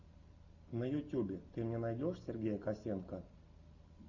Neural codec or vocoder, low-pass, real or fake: none; 7.2 kHz; real